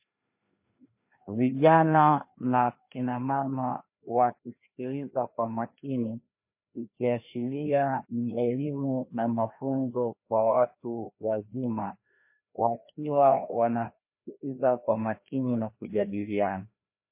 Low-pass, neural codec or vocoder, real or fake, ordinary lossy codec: 3.6 kHz; codec, 16 kHz, 1 kbps, FreqCodec, larger model; fake; MP3, 24 kbps